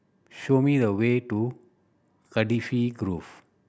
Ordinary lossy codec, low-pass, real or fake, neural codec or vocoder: none; none; real; none